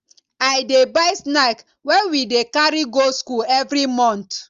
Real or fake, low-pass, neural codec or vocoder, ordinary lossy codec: real; 7.2 kHz; none; Opus, 32 kbps